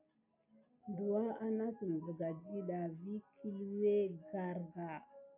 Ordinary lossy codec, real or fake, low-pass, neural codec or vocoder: AAC, 24 kbps; real; 3.6 kHz; none